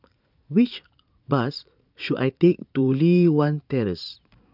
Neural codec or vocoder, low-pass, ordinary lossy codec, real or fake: vocoder, 44.1 kHz, 80 mel bands, Vocos; 5.4 kHz; none; fake